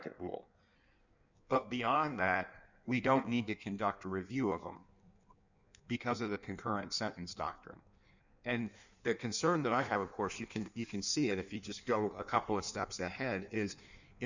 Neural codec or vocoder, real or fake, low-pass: codec, 16 kHz in and 24 kHz out, 1.1 kbps, FireRedTTS-2 codec; fake; 7.2 kHz